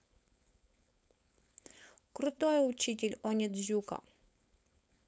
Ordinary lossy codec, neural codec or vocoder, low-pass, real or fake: none; codec, 16 kHz, 4.8 kbps, FACodec; none; fake